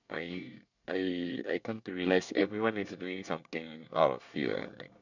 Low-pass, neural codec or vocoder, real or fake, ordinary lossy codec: 7.2 kHz; codec, 24 kHz, 1 kbps, SNAC; fake; none